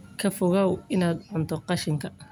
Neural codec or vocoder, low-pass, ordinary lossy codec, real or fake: none; none; none; real